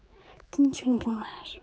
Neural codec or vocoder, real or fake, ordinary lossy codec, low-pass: codec, 16 kHz, 4 kbps, X-Codec, HuBERT features, trained on general audio; fake; none; none